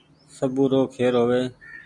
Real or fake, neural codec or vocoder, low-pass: real; none; 10.8 kHz